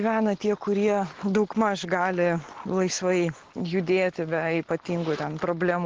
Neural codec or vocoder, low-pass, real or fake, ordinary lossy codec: none; 7.2 kHz; real; Opus, 16 kbps